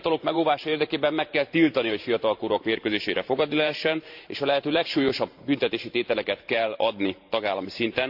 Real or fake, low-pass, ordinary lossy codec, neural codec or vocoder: fake; 5.4 kHz; AAC, 48 kbps; vocoder, 44.1 kHz, 128 mel bands every 512 samples, BigVGAN v2